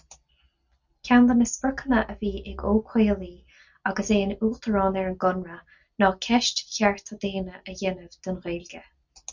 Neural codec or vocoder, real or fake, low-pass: none; real; 7.2 kHz